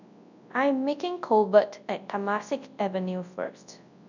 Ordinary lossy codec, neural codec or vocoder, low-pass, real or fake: none; codec, 24 kHz, 0.9 kbps, WavTokenizer, large speech release; 7.2 kHz; fake